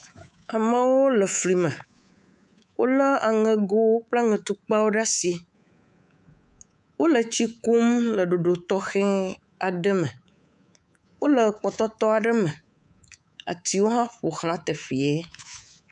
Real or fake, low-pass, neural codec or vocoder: fake; 10.8 kHz; codec, 24 kHz, 3.1 kbps, DualCodec